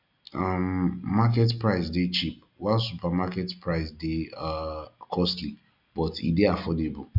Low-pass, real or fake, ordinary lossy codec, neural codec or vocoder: 5.4 kHz; real; none; none